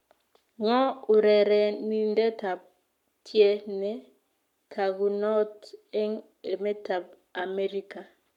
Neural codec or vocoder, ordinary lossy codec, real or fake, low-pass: codec, 44.1 kHz, 7.8 kbps, Pupu-Codec; none; fake; 19.8 kHz